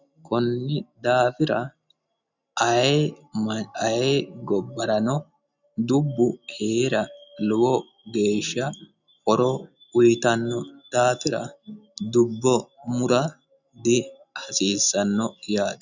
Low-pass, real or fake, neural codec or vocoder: 7.2 kHz; real; none